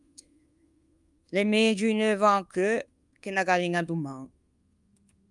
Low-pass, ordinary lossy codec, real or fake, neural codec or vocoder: 10.8 kHz; Opus, 32 kbps; fake; codec, 24 kHz, 1.2 kbps, DualCodec